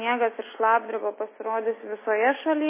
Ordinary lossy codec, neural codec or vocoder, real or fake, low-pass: MP3, 16 kbps; none; real; 3.6 kHz